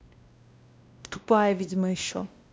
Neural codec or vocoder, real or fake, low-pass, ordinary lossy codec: codec, 16 kHz, 1 kbps, X-Codec, WavLM features, trained on Multilingual LibriSpeech; fake; none; none